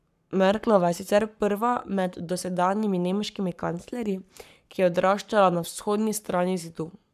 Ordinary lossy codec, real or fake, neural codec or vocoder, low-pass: none; fake; codec, 44.1 kHz, 7.8 kbps, Pupu-Codec; 14.4 kHz